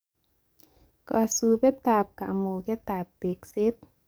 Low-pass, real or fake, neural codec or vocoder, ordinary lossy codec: none; fake; codec, 44.1 kHz, 7.8 kbps, DAC; none